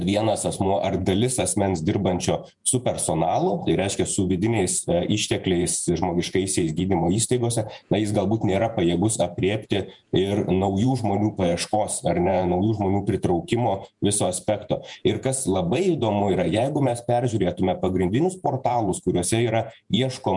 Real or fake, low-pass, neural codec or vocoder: fake; 10.8 kHz; vocoder, 48 kHz, 128 mel bands, Vocos